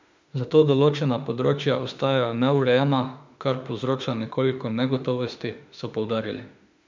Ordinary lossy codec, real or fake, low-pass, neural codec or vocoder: MP3, 64 kbps; fake; 7.2 kHz; autoencoder, 48 kHz, 32 numbers a frame, DAC-VAE, trained on Japanese speech